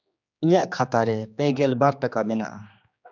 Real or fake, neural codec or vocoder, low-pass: fake; codec, 16 kHz, 2 kbps, X-Codec, HuBERT features, trained on general audio; 7.2 kHz